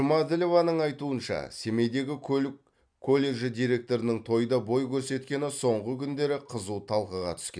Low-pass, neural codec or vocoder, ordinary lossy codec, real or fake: 9.9 kHz; none; none; real